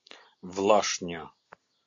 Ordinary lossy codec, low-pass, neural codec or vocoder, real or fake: AAC, 48 kbps; 7.2 kHz; none; real